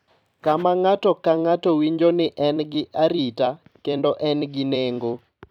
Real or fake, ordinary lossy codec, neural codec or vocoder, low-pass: fake; none; vocoder, 44.1 kHz, 128 mel bands every 256 samples, BigVGAN v2; 19.8 kHz